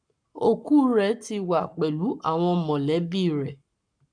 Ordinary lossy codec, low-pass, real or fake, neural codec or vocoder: none; 9.9 kHz; fake; codec, 44.1 kHz, 7.8 kbps, Pupu-Codec